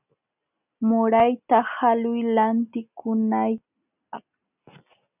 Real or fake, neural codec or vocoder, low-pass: real; none; 3.6 kHz